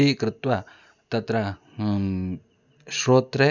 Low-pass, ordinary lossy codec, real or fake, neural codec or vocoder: 7.2 kHz; none; real; none